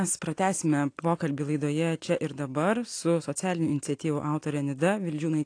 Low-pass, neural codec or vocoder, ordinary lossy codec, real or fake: 9.9 kHz; none; AAC, 48 kbps; real